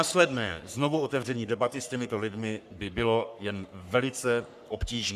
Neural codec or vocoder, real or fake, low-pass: codec, 44.1 kHz, 3.4 kbps, Pupu-Codec; fake; 14.4 kHz